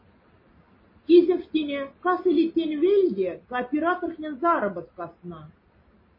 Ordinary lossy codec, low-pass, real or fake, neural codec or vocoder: MP3, 24 kbps; 5.4 kHz; real; none